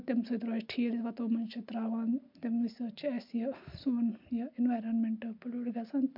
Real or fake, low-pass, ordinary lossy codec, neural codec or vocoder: real; 5.4 kHz; MP3, 48 kbps; none